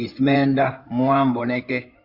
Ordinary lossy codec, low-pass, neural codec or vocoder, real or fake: Opus, 64 kbps; 5.4 kHz; codec, 16 kHz in and 24 kHz out, 2.2 kbps, FireRedTTS-2 codec; fake